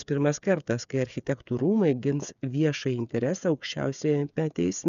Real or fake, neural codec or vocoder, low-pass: fake; codec, 16 kHz, 8 kbps, FreqCodec, smaller model; 7.2 kHz